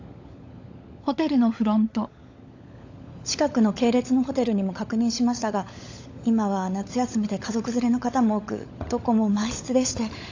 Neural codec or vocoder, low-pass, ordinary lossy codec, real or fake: codec, 16 kHz, 16 kbps, FunCodec, trained on LibriTTS, 50 frames a second; 7.2 kHz; AAC, 48 kbps; fake